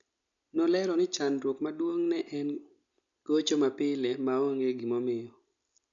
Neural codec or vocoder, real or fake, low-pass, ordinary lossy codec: none; real; 7.2 kHz; none